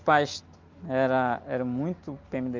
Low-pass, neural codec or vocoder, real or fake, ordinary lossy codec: 7.2 kHz; none; real; Opus, 24 kbps